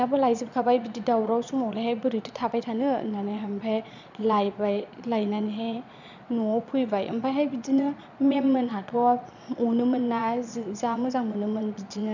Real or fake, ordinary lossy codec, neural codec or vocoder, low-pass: fake; none; vocoder, 22.05 kHz, 80 mel bands, Vocos; 7.2 kHz